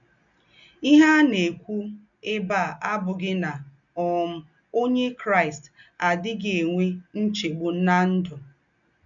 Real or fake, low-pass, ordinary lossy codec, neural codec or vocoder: real; 7.2 kHz; none; none